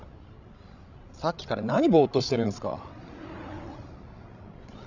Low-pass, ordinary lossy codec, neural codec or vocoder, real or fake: 7.2 kHz; none; codec, 16 kHz, 16 kbps, FreqCodec, larger model; fake